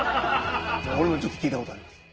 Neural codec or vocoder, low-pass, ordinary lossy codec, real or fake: none; 7.2 kHz; Opus, 16 kbps; real